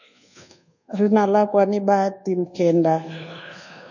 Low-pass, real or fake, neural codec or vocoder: 7.2 kHz; fake; codec, 24 kHz, 1.2 kbps, DualCodec